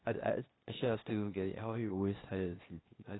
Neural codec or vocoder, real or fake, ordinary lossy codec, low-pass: codec, 16 kHz, 0.8 kbps, ZipCodec; fake; AAC, 16 kbps; 7.2 kHz